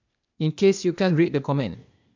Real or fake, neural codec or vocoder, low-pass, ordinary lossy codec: fake; codec, 16 kHz, 0.8 kbps, ZipCodec; 7.2 kHz; MP3, 64 kbps